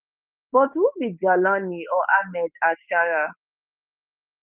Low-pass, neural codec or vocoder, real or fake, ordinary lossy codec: 3.6 kHz; codec, 16 kHz, 6 kbps, DAC; fake; Opus, 32 kbps